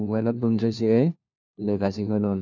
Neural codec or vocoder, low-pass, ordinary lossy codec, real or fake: codec, 16 kHz, 1 kbps, FunCodec, trained on LibriTTS, 50 frames a second; 7.2 kHz; none; fake